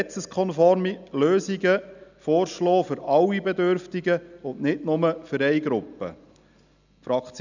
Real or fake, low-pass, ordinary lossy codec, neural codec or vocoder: real; 7.2 kHz; none; none